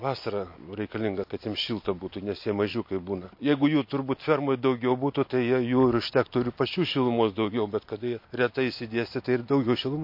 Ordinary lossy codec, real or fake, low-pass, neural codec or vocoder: MP3, 32 kbps; real; 5.4 kHz; none